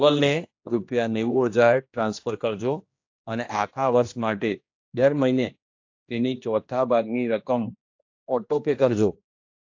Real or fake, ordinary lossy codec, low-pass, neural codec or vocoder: fake; AAC, 48 kbps; 7.2 kHz; codec, 16 kHz, 1 kbps, X-Codec, HuBERT features, trained on general audio